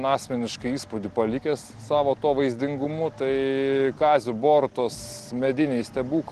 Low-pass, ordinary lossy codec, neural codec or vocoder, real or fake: 10.8 kHz; Opus, 16 kbps; none; real